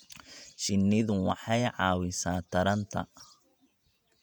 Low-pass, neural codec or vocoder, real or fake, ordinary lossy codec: 19.8 kHz; vocoder, 48 kHz, 128 mel bands, Vocos; fake; none